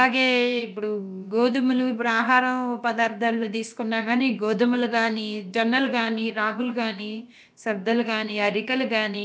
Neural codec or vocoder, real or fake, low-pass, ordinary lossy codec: codec, 16 kHz, about 1 kbps, DyCAST, with the encoder's durations; fake; none; none